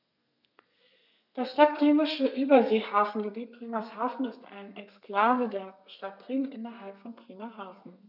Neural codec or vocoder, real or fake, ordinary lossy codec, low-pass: codec, 32 kHz, 1.9 kbps, SNAC; fake; none; 5.4 kHz